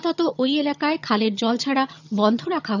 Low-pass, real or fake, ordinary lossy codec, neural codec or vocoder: 7.2 kHz; fake; none; vocoder, 22.05 kHz, 80 mel bands, HiFi-GAN